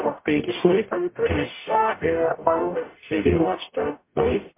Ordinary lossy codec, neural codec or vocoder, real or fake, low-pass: MP3, 24 kbps; codec, 44.1 kHz, 0.9 kbps, DAC; fake; 3.6 kHz